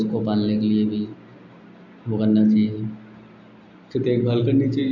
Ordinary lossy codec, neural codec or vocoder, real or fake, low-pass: AAC, 48 kbps; none; real; 7.2 kHz